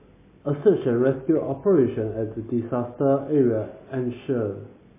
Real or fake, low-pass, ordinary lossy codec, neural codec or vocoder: real; 3.6 kHz; MP3, 16 kbps; none